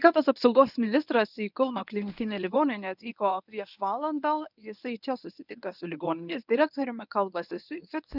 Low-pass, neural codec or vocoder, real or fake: 5.4 kHz; codec, 24 kHz, 0.9 kbps, WavTokenizer, medium speech release version 1; fake